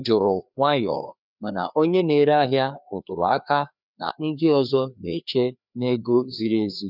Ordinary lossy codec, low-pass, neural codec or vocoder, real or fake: none; 5.4 kHz; codec, 16 kHz, 2 kbps, FreqCodec, larger model; fake